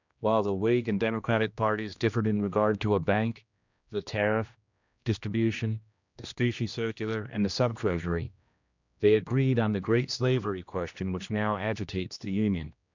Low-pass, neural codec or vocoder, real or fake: 7.2 kHz; codec, 16 kHz, 1 kbps, X-Codec, HuBERT features, trained on general audio; fake